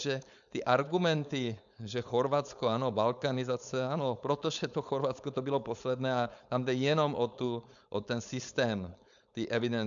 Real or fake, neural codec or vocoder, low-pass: fake; codec, 16 kHz, 4.8 kbps, FACodec; 7.2 kHz